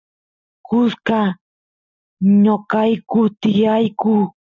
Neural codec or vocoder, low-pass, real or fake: none; 7.2 kHz; real